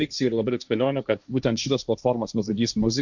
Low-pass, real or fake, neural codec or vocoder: 7.2 kHz; fake; codec, 16 kHz, 1.1 kbps, Voila-Tokenizer